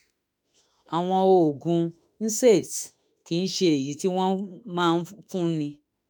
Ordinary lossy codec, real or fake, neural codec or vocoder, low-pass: none; fake; autoencoder, 48 kHz, 32 numbers a frame, DAC-VAE, trained on Japanese speech; none